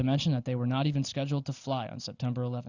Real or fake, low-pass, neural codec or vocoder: real; 7.2 kHz; none